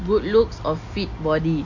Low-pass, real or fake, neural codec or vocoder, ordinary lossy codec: 7.2 kHz; real; none; AAC, 48 kbps